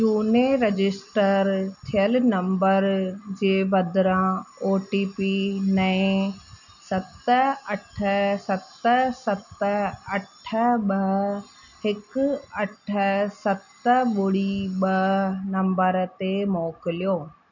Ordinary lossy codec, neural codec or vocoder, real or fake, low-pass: none; none; real; 7.2 kHz